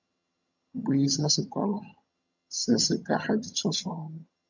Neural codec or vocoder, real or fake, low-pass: vocoder, 22.05 kHz, 80 mel bands, HiFi-GAN; fake; 7.2 kHz